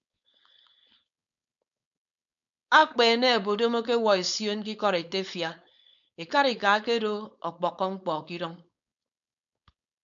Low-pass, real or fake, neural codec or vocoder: 7.2 kHz; fake; codec, 16 kHz, 4.8 kbps, FACodec